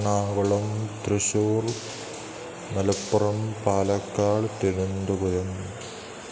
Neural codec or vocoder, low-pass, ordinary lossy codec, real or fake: none; none; none; real